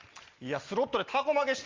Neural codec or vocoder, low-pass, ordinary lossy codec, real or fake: none; 7.2 kHz; Opus, 32 kbps; real